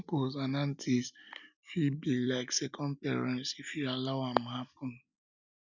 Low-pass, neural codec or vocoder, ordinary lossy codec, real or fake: 7.2 kHz; none; none; real